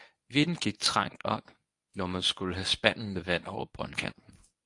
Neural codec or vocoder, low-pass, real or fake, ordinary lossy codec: codec, 24 kHz, 0.9 kbps, WavTokenizer, medium speech release version 1; 10.8 kHz; fake; AAC, 64 kbps